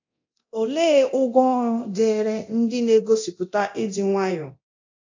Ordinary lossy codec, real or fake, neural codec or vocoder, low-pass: AAC, 48 kbps; fake; codec, 24 kHz, 0.9 kbps, DualCodec; 7.2 kHz